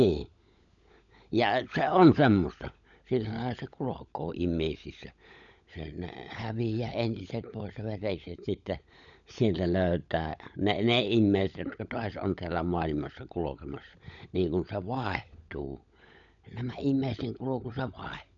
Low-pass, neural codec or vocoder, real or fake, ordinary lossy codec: 7.2 kHz; codec, 16 kHz, 16 kbps, FunCodec, trained on LibriTTS, 50 frames a second; fake; none